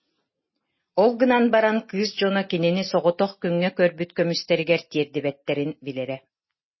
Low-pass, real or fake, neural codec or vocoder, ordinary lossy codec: 7.2 kHz; real; none; MP3, 24 kbps